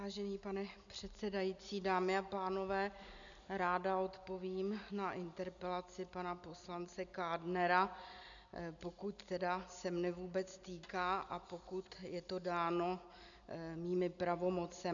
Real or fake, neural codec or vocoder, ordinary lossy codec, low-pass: real; none; MP3, 96 kbps; 7.2 kHz